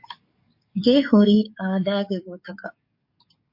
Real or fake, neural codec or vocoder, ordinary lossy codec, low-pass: fake; codec, 44.1 kHz, 7.8 kbps, DAC; MP3, 32 kbps; 5.4 kHz